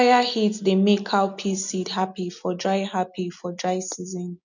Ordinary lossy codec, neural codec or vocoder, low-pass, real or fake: none; none; 7.2 kHz; real